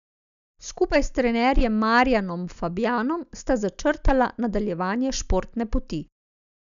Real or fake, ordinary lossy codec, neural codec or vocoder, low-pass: real; none; none; 7.2 kHz